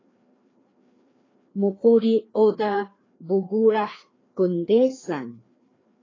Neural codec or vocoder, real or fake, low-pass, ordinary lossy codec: codec, 16 kHz, 2 kbps, FreqCodec, larger model; fake; 7.2 kHz; AAC, 32 kbps